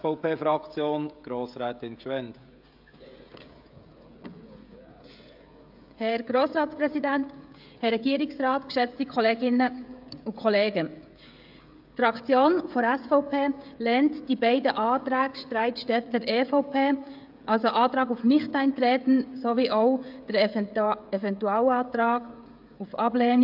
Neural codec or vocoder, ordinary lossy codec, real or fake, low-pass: codec, 16 kHz, 16 kbps, FreqCodec, smaller model; AAC, 48 kbps; fake; 5.4 kHz